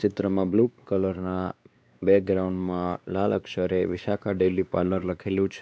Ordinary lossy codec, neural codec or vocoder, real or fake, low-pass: none; codec, 16 kHz, 2 kbps, X-Codec, WavLM features, trained on Multilingual LibriSpeech; fake; none